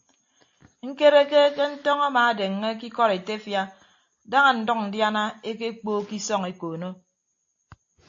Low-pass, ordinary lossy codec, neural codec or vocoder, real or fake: 7.2 kHz; AAC, 48 kbps; none; real